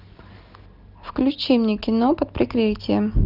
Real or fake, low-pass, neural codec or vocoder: real; 5.4 kHz; none